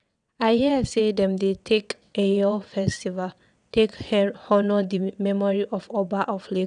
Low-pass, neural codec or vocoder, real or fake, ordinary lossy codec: 9.9 kHz; vocoder, 22.05 kHz, 80 mel bands, WaveNeXt; fake; none